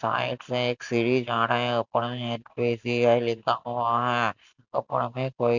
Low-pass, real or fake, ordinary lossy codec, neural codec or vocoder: 7.2 kHz; real; none; none